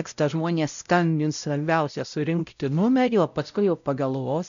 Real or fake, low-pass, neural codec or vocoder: fake; 7.2 kHz; codec, 16 kHz, 0.5 kbps, X-Codec, WavLM features, trained on Multilingual LibriSpeech